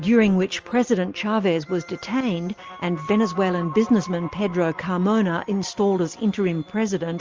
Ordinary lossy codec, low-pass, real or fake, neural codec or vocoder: Opus, 24 kbps; 7.2 kHz; real; none